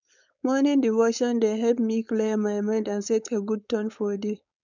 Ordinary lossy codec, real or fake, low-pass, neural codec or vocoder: none; fake; 7.2 kHz; codec, 16 kHz, 4.8 kbps, FACodec